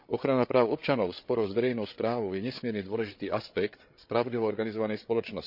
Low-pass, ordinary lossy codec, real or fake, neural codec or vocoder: 5.4 kHz; Opus, 64 kbps; fake; codec, 16 kHz, 8 kbps, FreqCodec, larger model